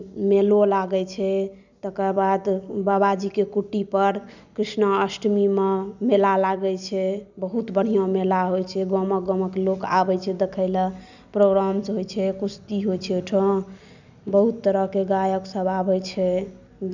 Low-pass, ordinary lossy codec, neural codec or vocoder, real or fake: 7.2 kHz; none; none; real